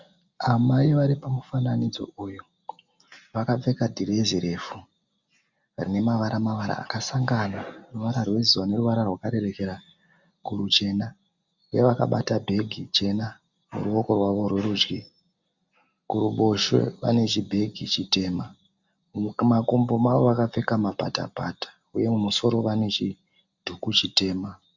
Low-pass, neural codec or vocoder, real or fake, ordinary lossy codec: 7.2 kHz; none; real; Opus, 64 kbps